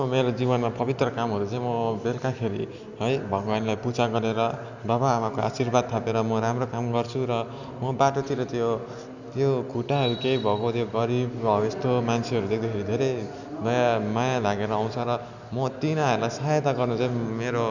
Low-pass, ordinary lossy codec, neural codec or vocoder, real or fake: 7.2 kHz; none; none; real